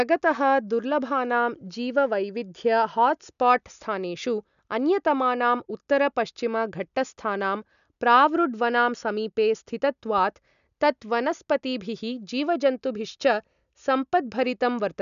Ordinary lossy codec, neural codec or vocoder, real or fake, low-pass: none; none; real; 7.2 kHz